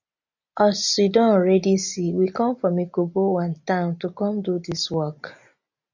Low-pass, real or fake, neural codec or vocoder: 7.2 kHz; real; none